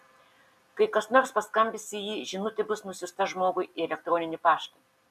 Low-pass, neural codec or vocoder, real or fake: 14.4 kHz; none; real